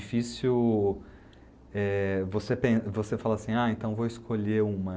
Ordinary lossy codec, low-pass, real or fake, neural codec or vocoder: none; none; real; none